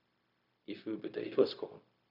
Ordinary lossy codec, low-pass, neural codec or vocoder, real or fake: Opus, 64 kbps; 5.4 kHz; codec, 16 kHz, 0.4 kbps, LongCat-Audio-Codec; fake